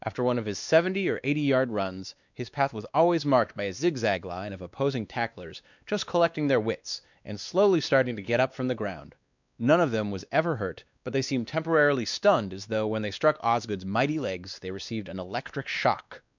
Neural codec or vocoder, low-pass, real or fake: codec, 16 kHz, 2 kbps, X-Codec, WavLM features, trained on Multilingual LibriSpeech; 7.2 kHz; fake